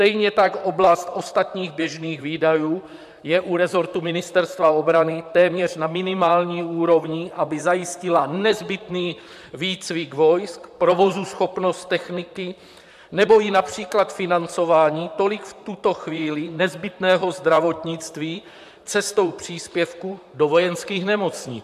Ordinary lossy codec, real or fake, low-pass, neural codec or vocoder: AAC, 96 kbps; fake; 14.4 kHz; vocoder, 44.1 kHz, 128 mel bands, Pupu-Vocoder